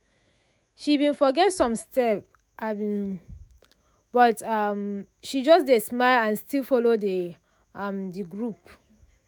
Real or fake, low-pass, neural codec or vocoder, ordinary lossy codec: fake; none; autoencoder, 48 kHz, 128 numbers a frame, DAC-VAE, trained on Japanese speech; none